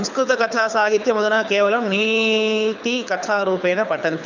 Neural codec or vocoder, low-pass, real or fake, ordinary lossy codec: codec, 24 kHz, 6 kbps, HILCodec; 7.2 kHz; fake; none